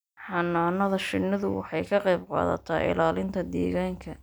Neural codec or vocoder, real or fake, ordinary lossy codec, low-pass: none; real; none; none